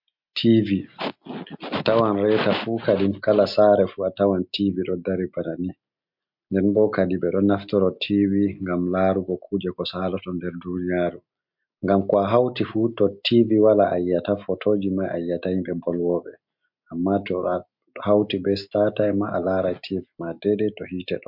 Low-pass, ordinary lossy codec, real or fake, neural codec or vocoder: 5.4 kHz; MP3, 48 kbps; real; none